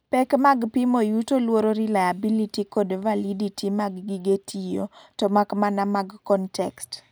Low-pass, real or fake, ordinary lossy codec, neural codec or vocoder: none; real; none; none